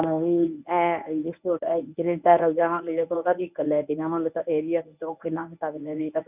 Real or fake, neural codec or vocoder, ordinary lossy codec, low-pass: fake; codec, 24 kHz, 0.9 kbps, WavTokenizer, medium speech release version 1; none; 3.6 kHz